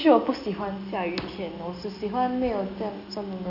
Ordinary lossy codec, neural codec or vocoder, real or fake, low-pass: none; none; real; 5.4 kHz